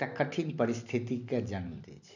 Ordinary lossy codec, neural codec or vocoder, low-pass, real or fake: none; none; 7.2 kHz; real